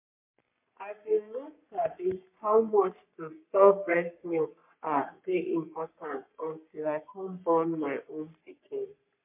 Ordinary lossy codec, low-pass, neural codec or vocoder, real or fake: MP3, 32 kbps; 3.6 kHz; codec, 44.1 kHz, 3.4 kbps, Pupu-Codec; fake